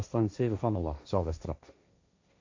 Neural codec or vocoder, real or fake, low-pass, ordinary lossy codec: codec, 16 kHz, 1.1 kbps, Voila-Tokenizer; fake; 7.2 kHz; AAC, 48 kbps